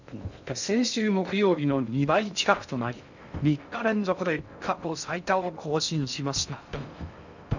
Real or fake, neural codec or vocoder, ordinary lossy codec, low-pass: fake; codec, 16 kHz in and 24 kHz out, 0.6 kbps, FocalCodec, streaming, 2048 codes; none; 7.2 kHz